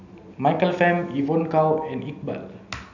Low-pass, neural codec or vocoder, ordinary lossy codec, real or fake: 7.2 kHz; none; none; real